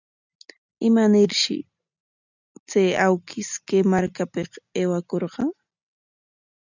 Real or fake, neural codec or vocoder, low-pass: real; none; 7.2 kHz